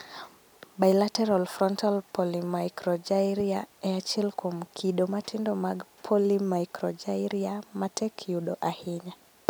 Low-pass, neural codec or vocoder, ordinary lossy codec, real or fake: none; none; none; real